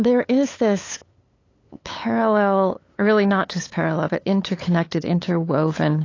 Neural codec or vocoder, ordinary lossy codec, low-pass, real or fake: codec, 16 kHz, 8 kbps, FunCodec, trained on LibriTTS, 25 frames a second; AAC, 32 kbps; 7.2 kHz; fake